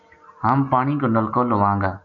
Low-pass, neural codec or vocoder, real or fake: 7.2 kHz; none; real